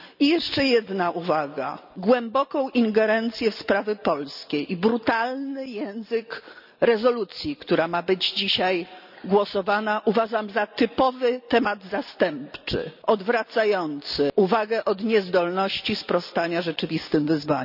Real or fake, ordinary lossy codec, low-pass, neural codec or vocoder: real; none; 5.4 kHz; none